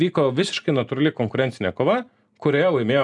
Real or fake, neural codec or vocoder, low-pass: real; none; 10.8 kHz